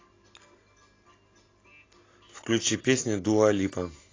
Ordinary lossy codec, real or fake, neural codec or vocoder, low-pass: AAC, 32 kbps; real; none; 7.2 kHz